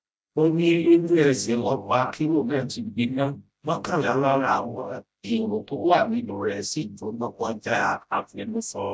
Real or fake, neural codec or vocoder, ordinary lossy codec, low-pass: fake; codec, 16 kHz, 0.5 kbps, FreqCodec, smaller model; none; none